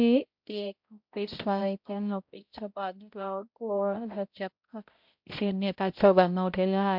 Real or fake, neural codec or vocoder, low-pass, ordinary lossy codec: fake; codec, 16 kHz, 0.5 kbps, X-Codec, HuBERT features, trained on balanced general audio; 5.4 kHz; MP3, 48 kbps